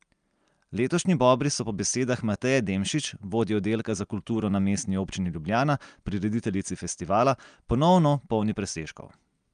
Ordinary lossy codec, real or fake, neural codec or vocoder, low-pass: Opus, 32 kbps; real; none; 9.9 kHz